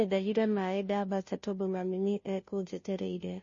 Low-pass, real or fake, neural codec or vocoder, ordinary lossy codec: 7.2 kHz; fake; codec, 16 kHz, 0.5 kbps, FunCodec, trained on Chinese and English, 25 frames a second; MP3, 32 kbps